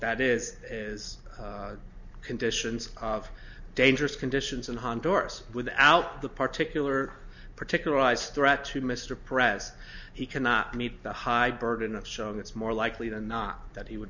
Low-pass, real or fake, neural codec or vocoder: 7.2 kHz; real; none